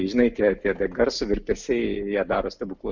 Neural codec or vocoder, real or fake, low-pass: none; real; 7.2 kHz